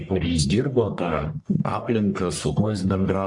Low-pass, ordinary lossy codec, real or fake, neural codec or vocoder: 10.8 kHz; AAC, 64 kbps; fake; codec, 44.1 kHz, 1.7 kbps, Pupu-Codec